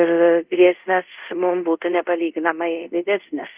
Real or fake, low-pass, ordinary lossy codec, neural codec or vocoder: fake; 3.6 kHz; Opus, 24 kbps; codec, 24 kHz, 0.5 kbps, DualCodec